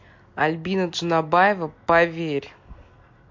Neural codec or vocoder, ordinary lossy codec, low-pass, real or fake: none; MP3, 48 kbps; 7.2 kHz; real